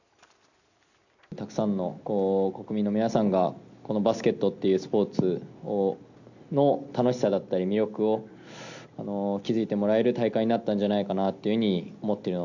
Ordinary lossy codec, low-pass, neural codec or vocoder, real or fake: none; 7.2 kHz; none; real